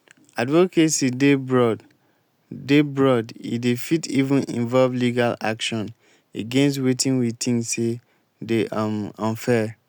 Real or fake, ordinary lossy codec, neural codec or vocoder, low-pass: real; none; none; none